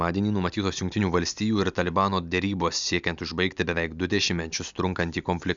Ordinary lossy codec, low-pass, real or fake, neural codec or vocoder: Opus, 64 kbps; 7.2 kHz; real; none